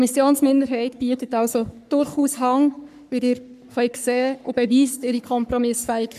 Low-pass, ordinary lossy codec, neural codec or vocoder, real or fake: 14.4 kHz; none; codec, 44.1 kHz, 3.4 kbps, Pupu-Codec; fake